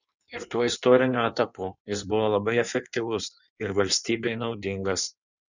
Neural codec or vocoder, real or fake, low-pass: codec, 16 kHz in and 24 kHz out, 1.1 kbps, FireRedTTS-2 codec; fake; 7.2 kHz